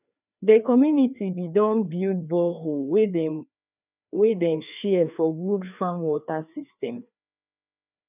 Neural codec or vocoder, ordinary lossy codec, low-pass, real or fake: codec, 16 kHz, 2 kbps, FreqCodec, larger model; none; 3.6 kHz; fake